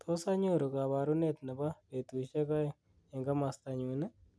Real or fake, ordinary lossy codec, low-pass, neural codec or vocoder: real; none; none; none